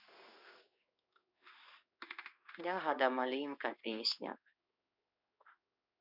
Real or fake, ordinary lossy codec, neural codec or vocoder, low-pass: fake; none; codec, 16 kHz in and 24 kHz out, 1 kbps, XY-Tokenizer; 5.4 kHz